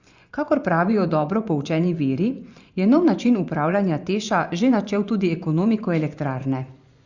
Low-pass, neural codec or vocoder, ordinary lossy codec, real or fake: 7.2 kHz; vocoder, 24 kHz, 100 mel bands, Vocos; Opus, 64 kbps; fake